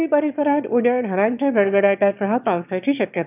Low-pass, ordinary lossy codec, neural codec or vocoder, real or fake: 3.6 kHz; none; autoencoder, 22.05 kHz, a latent of 192 numbers a frame, VITS, trained on one speaker; fake